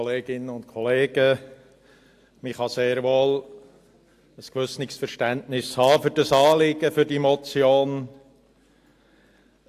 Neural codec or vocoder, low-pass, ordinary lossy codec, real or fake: none; 14.4 kHz; AAC, 64 kbps; real